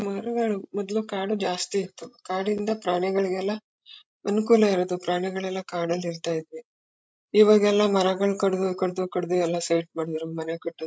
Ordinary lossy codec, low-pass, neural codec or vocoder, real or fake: none; none; codec, 16 kHz, 16 kbps, FreqCodec, larger model; fake